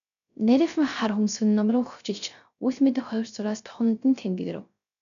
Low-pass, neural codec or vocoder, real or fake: 7.2 kHz; codec, 16 kHz, 0.3 kbps, FocalCodec; fake